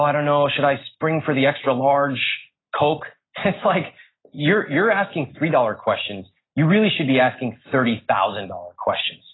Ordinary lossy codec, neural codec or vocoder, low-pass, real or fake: AAC, 16 kbps; none; 7.2 kHz; real